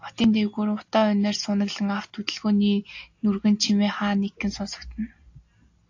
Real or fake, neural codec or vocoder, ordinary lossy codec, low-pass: real; none; AAC, 48 kbps; 7.2 kHz